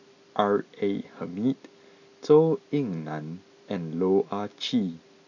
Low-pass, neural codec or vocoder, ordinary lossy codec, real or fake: 7.2 kHz; none; none; real